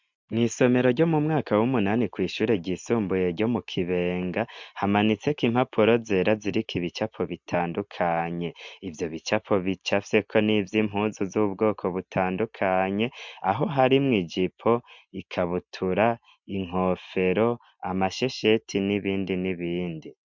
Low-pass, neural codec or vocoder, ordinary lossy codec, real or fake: 7.2 kHz; none; MP3, 64 kbps; real